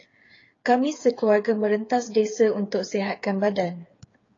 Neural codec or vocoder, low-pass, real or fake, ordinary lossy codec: codec, 16 kHz, 8 kbps, FreqCodec, smaller model; 7.2 kHz; fake; AAC, 32 kbps